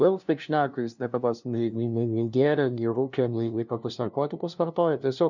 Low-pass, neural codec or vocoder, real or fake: 7.2 kHz; codec, 16 kHz, 0.5 kbps, FunCodec, trained on LibriTTS, 25 frames a second; fake